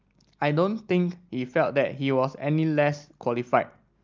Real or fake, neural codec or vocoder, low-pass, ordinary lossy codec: real; none; 7.2 kHz; Opus, 32 kbps